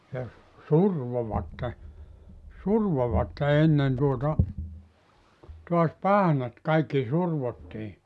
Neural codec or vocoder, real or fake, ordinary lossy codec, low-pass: none; real; none; none